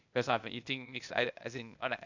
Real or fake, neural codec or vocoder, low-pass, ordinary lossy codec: fake; codec, 16 kHz, 0.8 kbps, ZipCodec; 7.2 kHz; none